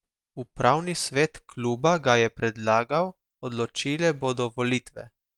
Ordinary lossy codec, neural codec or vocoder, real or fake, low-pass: Opus, 32 kbps; none; real; 19.8 kHz